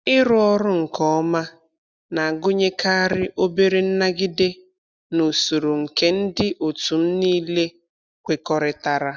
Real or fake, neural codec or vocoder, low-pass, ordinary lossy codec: real; none; none; none